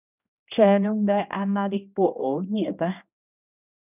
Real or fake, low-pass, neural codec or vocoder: fake; 3.6 kHz; codec, 16 kHz, 1 kbps, X-Codec, HuBERT features, trained on general audio